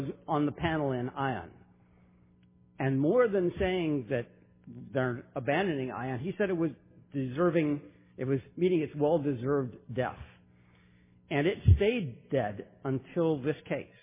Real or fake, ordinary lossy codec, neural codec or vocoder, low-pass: real; MP3, 16 kbps; none; 3.6 kHz